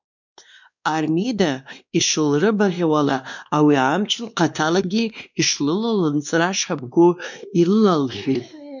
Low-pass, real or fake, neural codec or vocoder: 7.2 kHz; fake; codec, 16 kHz, 2 kbps, X-Codec, WavLM features, trained on Multilingual LibriSpeech